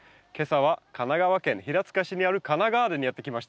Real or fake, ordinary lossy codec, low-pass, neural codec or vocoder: real; none; none; none